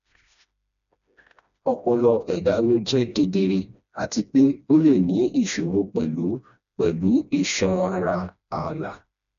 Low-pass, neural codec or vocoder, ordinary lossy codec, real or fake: 7.2 kHz; codec, 16 kHz, 1 kbps, FreqCodec, smaller model; MP3, 96 kbps; fake